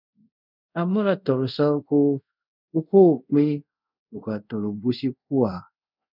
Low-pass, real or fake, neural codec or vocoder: 5.4 kHz; fake; codec, 24 kHz, 0.5 kbps, DualCodec